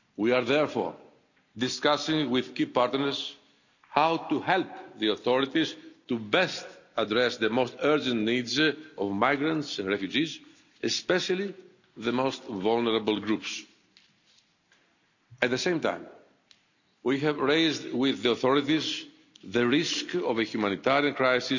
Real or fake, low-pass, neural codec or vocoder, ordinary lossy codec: real; 7.2 kHz; none; none